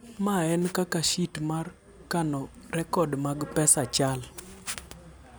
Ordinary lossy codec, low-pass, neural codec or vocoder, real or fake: none; none; none; real